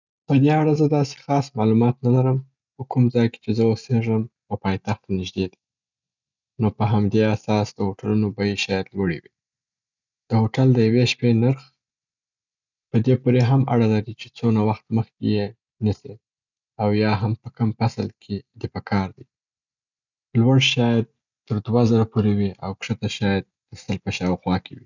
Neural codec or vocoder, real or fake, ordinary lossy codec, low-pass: none; real; none; 7.2 kHz